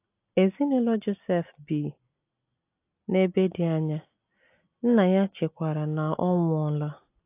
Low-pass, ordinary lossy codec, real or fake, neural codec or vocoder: 3.6 kHz; AAC, 24 kbps; real; none